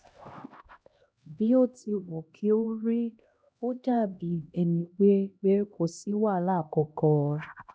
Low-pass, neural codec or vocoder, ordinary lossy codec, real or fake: none; codec, 16 kHz, 1 kbps, X-Codec, HuBERT features, trained on LibriSpeech; none; fake